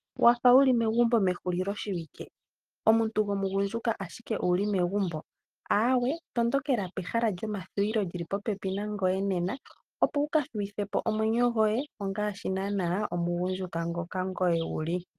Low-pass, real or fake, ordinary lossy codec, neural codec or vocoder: 14.4 kHz; real; Opus, 24 kbps; none